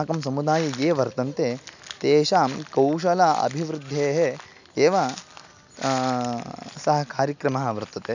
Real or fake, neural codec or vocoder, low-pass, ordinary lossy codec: real; none; 7.2 kHz; none